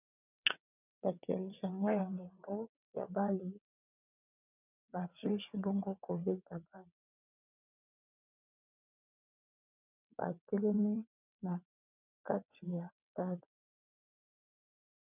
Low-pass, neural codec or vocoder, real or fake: 3.6 kHz; vocoder, 22.05 kHz, 80 mel bands, WaveNeXt; fake